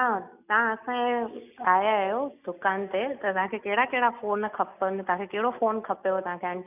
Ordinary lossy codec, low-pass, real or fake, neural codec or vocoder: none; 3.6 kHz; real; none